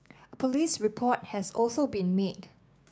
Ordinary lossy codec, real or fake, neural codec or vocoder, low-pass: none; fake; codec, 16 kHz, 6 kbps, DAC; none